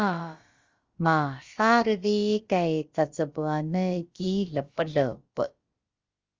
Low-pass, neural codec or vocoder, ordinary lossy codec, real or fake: 7.2 kHz; codec, 16 kHz, about 1 kbps, DyCAST, with the encoder's durations; Opus, 32 kbps; fake